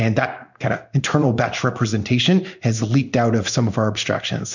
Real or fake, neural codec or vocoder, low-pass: fake; codec, 16 kHz in and 24 kHz out, 1 kbps, XY-Tokenizer; 7.2 kHz